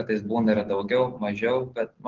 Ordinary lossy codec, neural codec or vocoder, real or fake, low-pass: Opus, 16 kbps; none; real; 7.2 kHz